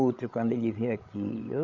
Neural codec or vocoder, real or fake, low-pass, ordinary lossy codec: codec, 16 kHz, 8 kbps, FreqCodec, larger model; fake; 7.2 kHz; none